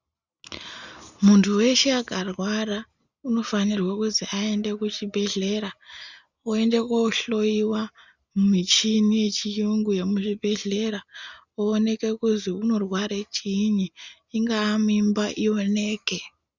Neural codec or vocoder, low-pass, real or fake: none; 7.2 kHz; real